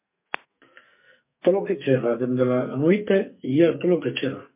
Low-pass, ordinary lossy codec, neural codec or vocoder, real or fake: 3.6 kHz; MP3, 32 kbps; codec, 44.1 kHz, 2.6 kbps, SNAC; fake